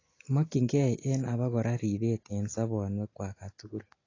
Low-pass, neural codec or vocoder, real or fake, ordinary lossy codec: 7.2 kHz; none; real; AAC, 32 kbps